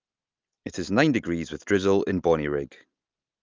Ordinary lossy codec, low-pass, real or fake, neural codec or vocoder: Opus, 32 kbps; 7.2 kHz; real; none